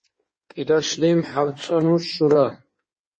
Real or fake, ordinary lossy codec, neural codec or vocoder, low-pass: fake; MP3, 32 kbps; codec, 16 kHz in and 24 kHz out, 1.1 kbps, FireRedTTS-2 codec; 9.9 kHz